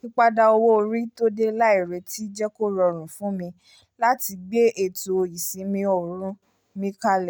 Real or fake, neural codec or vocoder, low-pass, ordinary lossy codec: real; none; none; none